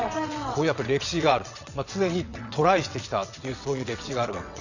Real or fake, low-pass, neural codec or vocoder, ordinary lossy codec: fake; 7.2 kHz; vocoder, 22.05 kHz, 80 mel bands, WaveNeXt; none